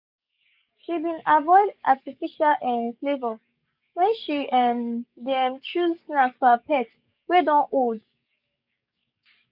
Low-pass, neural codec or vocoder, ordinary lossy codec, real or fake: 5.4 kHz; codec, 44.1 kHz, 7.8 kbps, DAC; none; fake